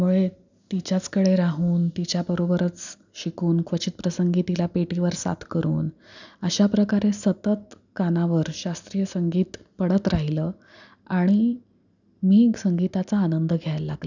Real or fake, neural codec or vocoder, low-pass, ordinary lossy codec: real; none; 7.2 kHz; none